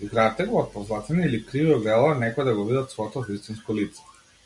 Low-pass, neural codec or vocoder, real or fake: 10.8 kHz; none; real